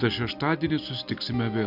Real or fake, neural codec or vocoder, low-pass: real; none; 5.4 kHz